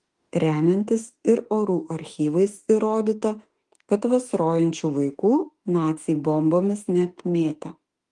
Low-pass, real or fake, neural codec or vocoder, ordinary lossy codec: 10.8 kHz; fake; autoencoder, 48 kHz, 32 numbers a frame, DAC-VAE, trained on Japanese speech; Opus, 24 kbps